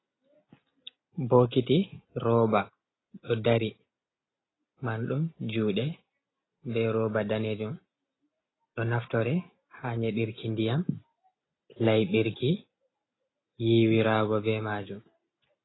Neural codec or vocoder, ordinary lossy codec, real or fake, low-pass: none; AAC, 16 kbps; real; 7.2 kHz